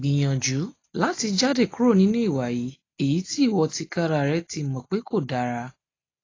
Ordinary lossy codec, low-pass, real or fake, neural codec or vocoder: AAC, 32 kbps; 7.2 kHz; real; none